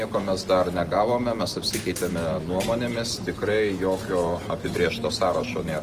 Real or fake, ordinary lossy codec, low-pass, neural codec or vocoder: real; Opus, 24 kbps; 14.4 kHz; none